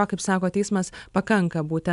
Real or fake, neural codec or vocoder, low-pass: real; none; 10.8 kHz